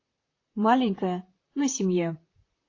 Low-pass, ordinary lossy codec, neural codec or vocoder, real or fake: 7.2 kHz; AAC, 32 kbps; vocoder, 44.1 kHz, 128 mel bands, Pupu-Vocoder; fake